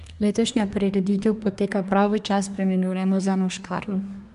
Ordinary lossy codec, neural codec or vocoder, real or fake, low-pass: none; codec, 24 kHz, 1 kbps, SNAC; fake; 10.8 kHz